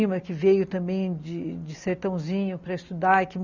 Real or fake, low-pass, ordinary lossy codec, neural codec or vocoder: real; 7.2 kHz; none; none